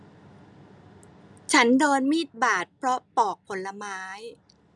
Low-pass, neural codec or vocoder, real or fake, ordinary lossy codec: none; none; real; none